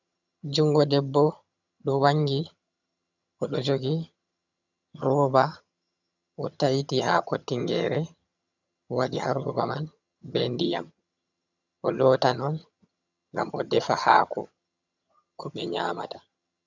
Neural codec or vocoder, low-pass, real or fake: vocoder, 22.05 kHz, 80 mel bands, HiFi-GAN; 7.2 kHz; fake